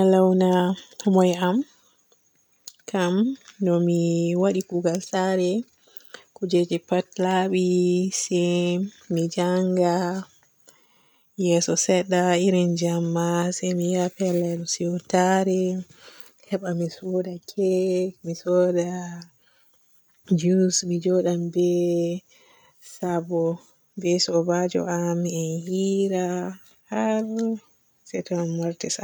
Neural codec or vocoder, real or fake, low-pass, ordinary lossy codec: none; real; none; none